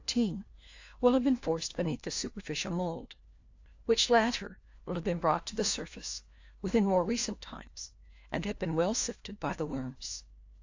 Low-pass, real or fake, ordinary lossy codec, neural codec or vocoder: 7.2 kHz; fake; AAC, 48 kbps; codec, 16 kHz, 1 kbps, FunCodec, trained on LibriTTS, 50 frames a second